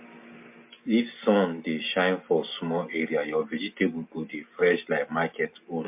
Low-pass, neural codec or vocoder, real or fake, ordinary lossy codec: 3.6 kHz; none; real; MP3, 32 kbps